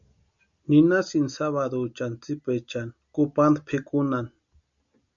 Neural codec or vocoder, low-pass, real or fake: none; 7.2 kHz; real